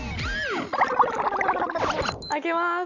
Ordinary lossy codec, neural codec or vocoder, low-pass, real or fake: none; none; 7.2 kHz; real